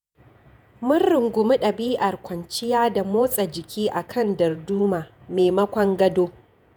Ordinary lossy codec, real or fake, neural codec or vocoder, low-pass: none; fake; vocoder, 48 kHz, 128 mel bands, Vocos; none